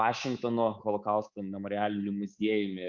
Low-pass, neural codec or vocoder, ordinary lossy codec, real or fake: 7.2 kHz; codec, 24 kHz, 3.1 kbps, DualCodec; Opus, 64 kbps; fake